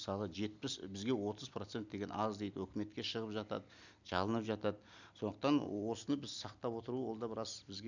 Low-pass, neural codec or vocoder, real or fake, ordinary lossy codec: 7.2 kHz; none; real; none